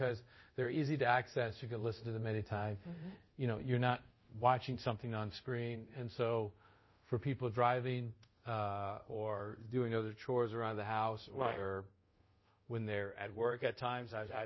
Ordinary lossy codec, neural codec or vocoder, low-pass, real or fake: MP3, 24 kbps; codec, 24 kHz, 0.5 kbps, DualCodec; 7.2 kHz; fake